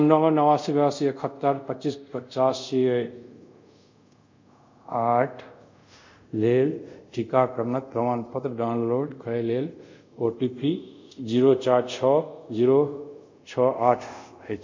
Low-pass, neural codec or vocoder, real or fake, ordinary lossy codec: 7.2 kHz; codec, 24 kHz, 0.5 kbps, DualCodec; fake; MP3, 64 kbps